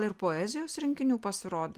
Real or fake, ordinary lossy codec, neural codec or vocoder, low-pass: real; Opus, 24 kbps; none; 14.4 kHz